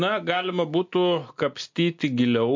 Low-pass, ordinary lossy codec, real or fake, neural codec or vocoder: 7.2 kHz; MP3, 48 kbps; real; none